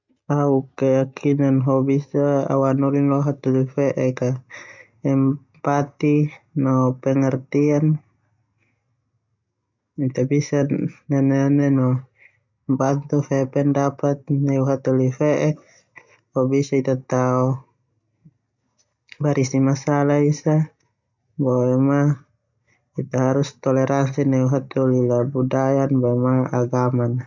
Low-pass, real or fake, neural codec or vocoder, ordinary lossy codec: 7.2 kHz; real; none; none